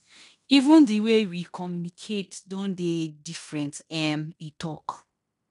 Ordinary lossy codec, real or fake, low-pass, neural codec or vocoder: none; fake; 10.8 kHz; codec, 16 kHz in and 24 kHz out, 0.9 kbps, LongCat-Audio-Codec, fine tuned four codebook decoder